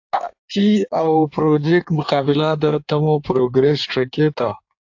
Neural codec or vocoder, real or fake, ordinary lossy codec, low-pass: codec, 16 kHz in and 24 kHz out, 1.1 kbps, FireRedTTS-2 codec; fake; AAC, 48 kbps; 7.2 kHz